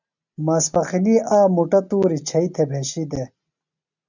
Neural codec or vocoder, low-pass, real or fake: none; 7.2 kHz; real